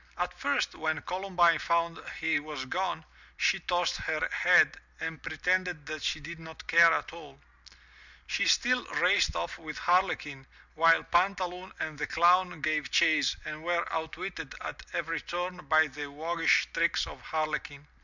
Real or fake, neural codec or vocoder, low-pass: real; none; 7.2 kHz